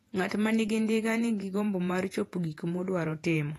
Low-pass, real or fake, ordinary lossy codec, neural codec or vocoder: 14.4 kHz; fake; AAC, 48 kbps; vocoder, 48 kHz, 128 mel bands, Vocos